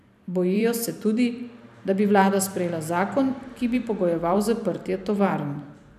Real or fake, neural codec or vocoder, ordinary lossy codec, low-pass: fake; vocoder, 44.1 kHz, 128 mel bands every 512 samples, BigVGAN v2; none; 14.4 kHz